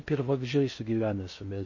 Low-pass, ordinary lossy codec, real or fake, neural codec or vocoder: 7.2 kHz; MP3, 32 kbps; fake; codec, 16 kHz in and 24 kHz out, 0.6 kbps, FocalCodec, streaming, 4096 codes